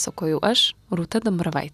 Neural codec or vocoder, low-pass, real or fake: none; 14.4 kHz; real